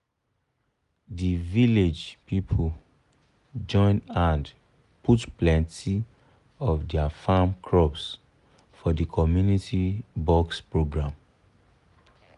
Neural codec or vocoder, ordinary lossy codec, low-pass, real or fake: none; none; 10.8 kHz; real